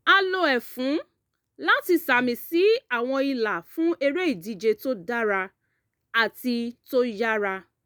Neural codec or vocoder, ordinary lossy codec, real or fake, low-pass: none; none; real; none